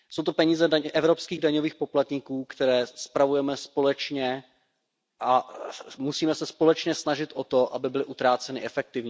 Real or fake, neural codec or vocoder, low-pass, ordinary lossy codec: real; none; none; none